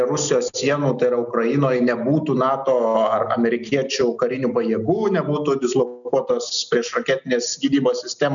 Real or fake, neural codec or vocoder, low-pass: real; none; 7.2 kHz